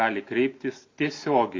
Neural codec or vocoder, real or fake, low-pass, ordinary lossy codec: none; real; 7.2 kHz; MP3, 48 kbps